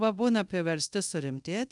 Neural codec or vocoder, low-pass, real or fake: codec, 24 kHz, 0.5 kbps, DualCodec; 10.8 kHz; fake